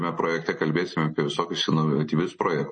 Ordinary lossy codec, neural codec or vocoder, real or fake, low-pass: MP3, 32 kbps; none; real; 10.8 kHz